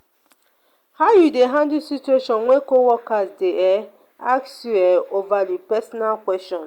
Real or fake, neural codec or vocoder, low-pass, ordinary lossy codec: real; none; 19.8 kHz; Opus, 64 kbps